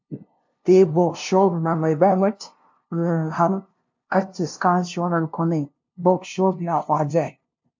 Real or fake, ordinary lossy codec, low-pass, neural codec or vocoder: fake; MP3, 48 kbps; 7.2 kHz; codec, 16 kHz, 0.5 kbps, FunCodec, trained on LibriTTS, 25 frames a second